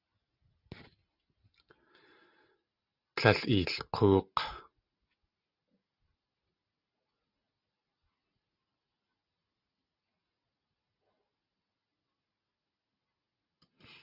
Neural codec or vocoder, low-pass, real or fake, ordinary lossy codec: none; 5.4 kHz; real; Opus, 64 kbps